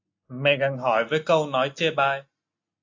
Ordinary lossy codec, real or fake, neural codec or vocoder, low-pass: AAC, 48 kbps; real; none; 7.2 kHz